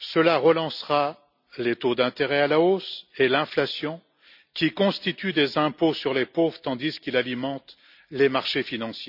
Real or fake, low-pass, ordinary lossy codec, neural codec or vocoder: real; 5.4 kHz; none; none